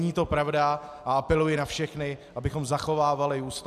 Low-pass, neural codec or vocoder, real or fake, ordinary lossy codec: 14.4 kHz; none; real; AAC, 96 kbps